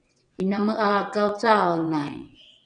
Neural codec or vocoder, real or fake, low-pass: vocoder, 22.05 kHz, 80 mel bands, WaveNeXt; fake; 9.9 kHz